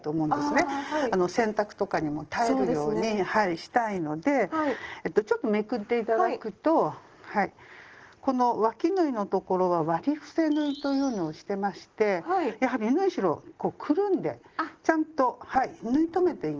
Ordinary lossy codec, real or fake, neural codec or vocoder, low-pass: Opus, 16 kbps; real; none; 7.2 kHz